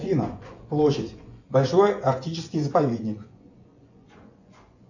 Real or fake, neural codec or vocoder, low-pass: fake; vocoder, 24 kHz, 100 mel bands, Vocos; 7.2 kHz